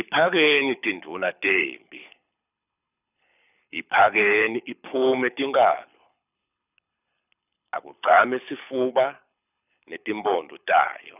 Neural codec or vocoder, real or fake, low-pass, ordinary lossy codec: codec, 24 kHz, 6 kbps, HILCodec; fake; 3.6 kHz; none